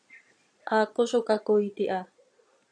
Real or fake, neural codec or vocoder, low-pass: fake; vocoder, 22.05 kHz, 80 mel bands, Vocos; 9.9 kHz